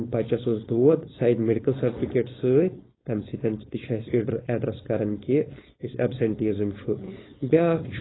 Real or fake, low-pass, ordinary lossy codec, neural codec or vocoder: fake; 7.2 kHz; AAC, 16 kbps; codec, 16 kHz, 4.8 kbps, FACodec